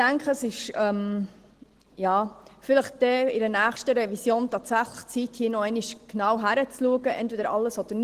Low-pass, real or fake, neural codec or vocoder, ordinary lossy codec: 14.4 kHz; real; none; Opus, 16 kbps